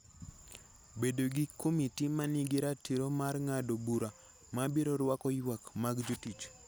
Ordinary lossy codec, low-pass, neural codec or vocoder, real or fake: none; none; none; real